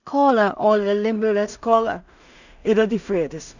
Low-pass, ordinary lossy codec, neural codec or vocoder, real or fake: 7.2 kHz; none; codec, 16 kHz in and 24 kHz out, 0.4 kbps, LongCat-Audio-Codec, two codebook decoder; fake